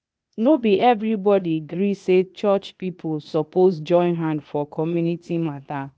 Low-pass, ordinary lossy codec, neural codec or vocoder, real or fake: none; none; codec, 16 kHz, 0.8 kbps, ZipCodec; fake